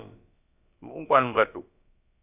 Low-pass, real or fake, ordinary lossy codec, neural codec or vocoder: 3.6 kHz; fake; AAC, 32 kbps; codec, 16 kHz, about 1 kbps, DyCAST, with the encoder's durations